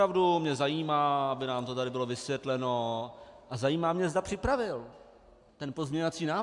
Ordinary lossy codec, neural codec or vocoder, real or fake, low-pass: AAC, 64 kbps; none; real; 10.8 kHz